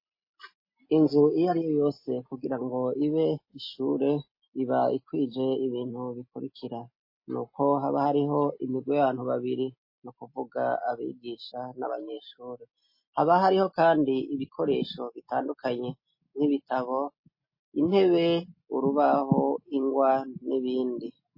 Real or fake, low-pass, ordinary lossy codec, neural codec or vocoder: real; 5.4 kHz; MP3, 24 kbps; none